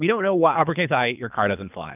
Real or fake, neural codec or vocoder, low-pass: fake; codec, 24 kHz, 3 kbps, HILCodec; 3.6 kHz